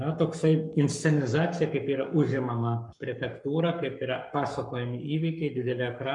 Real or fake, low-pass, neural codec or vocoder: fake; 10.8 kHz; codec, 44.1 kHz, 7.8 kbps, Pupu-Codec